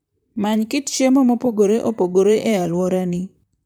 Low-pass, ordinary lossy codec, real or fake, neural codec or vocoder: none; none; fake; vocoder, 44.1 kHz, 128 mel bands, Pupu-Vocoder